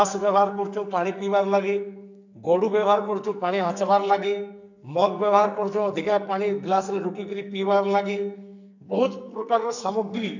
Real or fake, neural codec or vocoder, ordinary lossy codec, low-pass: fake; codec, 44.1 kHz, 2.6 kbps, SNAC; none; 7.2 kHz